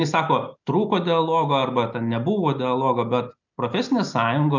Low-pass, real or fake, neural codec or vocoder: 7.2 kHz; real; none